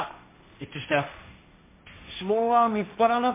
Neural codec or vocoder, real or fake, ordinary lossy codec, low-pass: codec, 16 kHz, 1.1 kbps, Voila-Tokenizer; fake; MP3, 24 kbps; 3.6 kHz